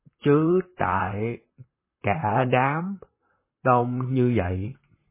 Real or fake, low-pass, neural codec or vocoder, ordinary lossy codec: fake; 3.6 kHz; vocoder, 44.1 kHz, 128 mel bands, Pupu-Vocoder; MP3, 16 kbps